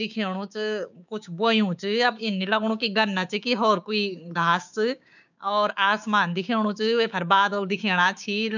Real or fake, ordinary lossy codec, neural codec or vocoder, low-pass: fake; none; codec, 16 kHz, 6 kbps, DAC; 7.2 kHz